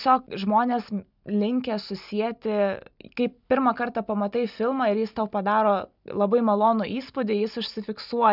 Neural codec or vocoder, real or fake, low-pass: none; real; 5.4 kHz